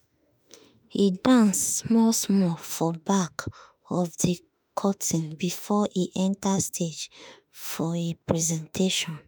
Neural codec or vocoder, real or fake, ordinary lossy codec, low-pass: autoencoder, 48 kHz, 32 numbers a frame, DAC-VAE, trained on Japanese speech; fake; none; none